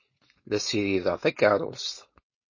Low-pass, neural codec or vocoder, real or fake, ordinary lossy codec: 7.2 kHz; codec, 16 kHz, 4.8 kbps, FACodec; fake; MP3, 32 kbps